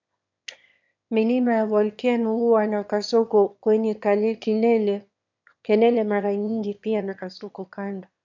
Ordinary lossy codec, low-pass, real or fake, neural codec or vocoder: MP3, 64 kbps; 7.2 kHz; fake; autoencoder, 22.05 kHz, a latent of 192 numbers a frame, VITS, trained on one speaker